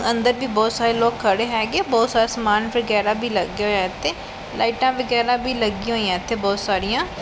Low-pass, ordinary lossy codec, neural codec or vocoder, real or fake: none; none; none; real